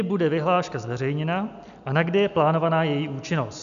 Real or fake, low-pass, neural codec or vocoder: real; 7.2 kHz; none